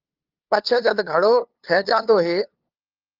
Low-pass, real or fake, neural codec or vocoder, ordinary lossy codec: 5.4 kHz; fake; codec, 16 kHz, 2 kbps, FunCodec, trained on LibriTTS, 25 frames a second; Opus, 32 kbps